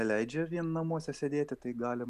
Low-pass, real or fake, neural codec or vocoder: 14.4 kHz; real; none